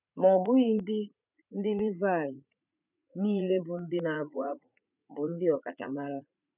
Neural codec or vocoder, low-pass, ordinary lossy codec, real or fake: vocoder, 44.1 kHz, 80 mel bands, Vocos; 3.6 kHz; none; fake